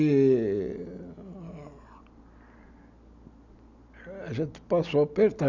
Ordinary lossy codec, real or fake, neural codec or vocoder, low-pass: none; real; none; 7.2 kHz